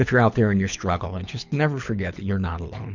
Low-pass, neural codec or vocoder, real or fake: 7.2 kHz; codec, 24 kHz, 6 kbps, HILCodec; fake